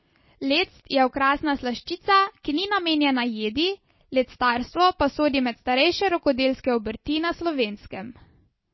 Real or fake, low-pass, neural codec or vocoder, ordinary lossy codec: real; 7.2 kHz; none; MP3, 24 kbps